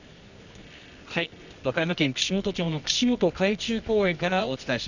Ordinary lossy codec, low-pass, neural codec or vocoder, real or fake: none; 7.2 kHz; codec, 24 kHz, 0.9 kbps, WavTokenizer, medium music audio release; fake